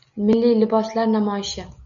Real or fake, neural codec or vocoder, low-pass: real; none; 7.2 kHz